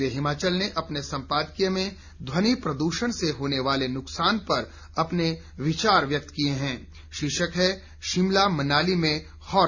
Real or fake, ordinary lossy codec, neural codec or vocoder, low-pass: real; none; none; 7.2 kHz